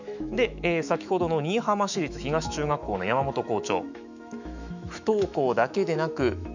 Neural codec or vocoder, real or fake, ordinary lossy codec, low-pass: autoencoder, 48 kHz, 128 numbers a frame, DAC-VAE, trained on Japanese speech; fake; none; 7.2 kHz